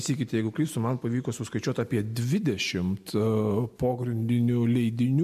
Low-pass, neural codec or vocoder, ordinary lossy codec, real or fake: 14.4 kHz; none; MP3, 64 kbps; real